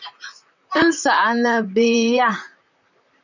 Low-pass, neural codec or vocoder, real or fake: 7.2 kHz; vocoder, 44.1 kHz, 128 mel bands, Pupu-Vocoder; fake